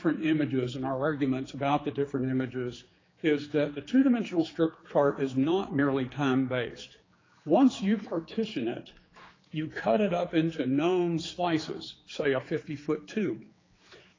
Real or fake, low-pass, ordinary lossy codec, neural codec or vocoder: fake; 7.2 kHz; AAC, 32 kbps; codec, 16 kHz, 4 kbps, X-Codec, HuBERT features, trained on general audio